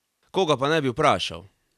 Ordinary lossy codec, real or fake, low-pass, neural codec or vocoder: none; real; 14.4 kHz; none